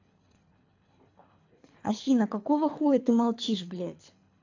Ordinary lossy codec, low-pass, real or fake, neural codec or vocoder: AAC, 48 kbps; 7.2 kHz; fake; codec, 24 kHz, 3 kbps, HILCodec